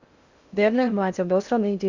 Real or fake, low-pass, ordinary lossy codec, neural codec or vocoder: fake; 7.2 kHz; Opus, 64 kbps; codec, 16 kHz in and 24 kHz out, 0.6 kbps, FocalCodec, streaming, 2048 codes